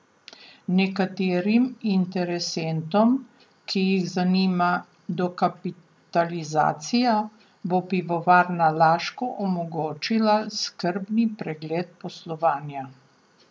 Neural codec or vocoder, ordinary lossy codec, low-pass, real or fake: none; none; none; real